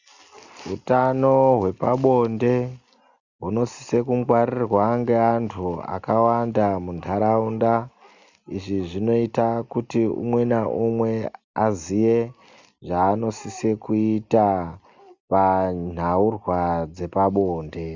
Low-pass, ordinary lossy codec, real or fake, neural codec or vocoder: 7.2 kHz; Opus, 64 kbps; real; none